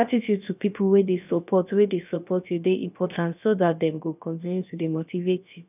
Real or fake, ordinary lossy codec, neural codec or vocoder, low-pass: fake; none; codec, 16 kHz, about 1 kbps, DyCAST, with the encoder's durations; 3.6 kHz